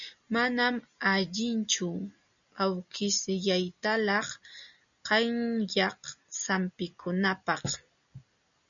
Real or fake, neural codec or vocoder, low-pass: real; none; 7.2 kHz